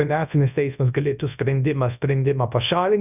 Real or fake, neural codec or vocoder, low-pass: fake; codec, 24 kHz, 0.9 kbps, WavTokenizer, large speech release; 3.6 kHz